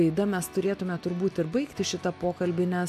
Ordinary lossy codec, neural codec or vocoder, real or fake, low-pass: MP3, 96 kbps; none; real; 14.4 kHz